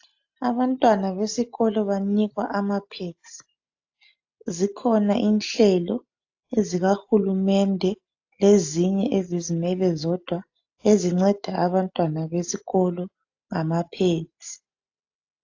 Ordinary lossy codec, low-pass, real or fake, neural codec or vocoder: AAC, 48 kbps; 7.2 kHz; real; none